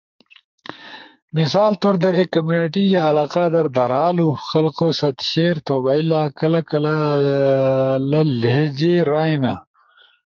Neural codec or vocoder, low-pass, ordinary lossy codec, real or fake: codec, 44.1 kHz, 2.6 kbps, SNAC; 7.2 kHz; MP3, 64 kbps; fake